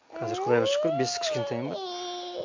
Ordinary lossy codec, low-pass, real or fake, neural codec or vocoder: MP3, 48 kbps; 7.2 kHz; real; none